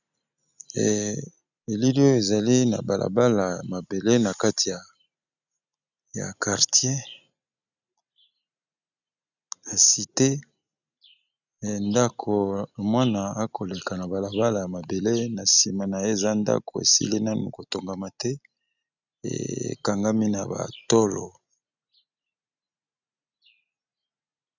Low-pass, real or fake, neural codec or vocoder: 7.2 kHz; real; none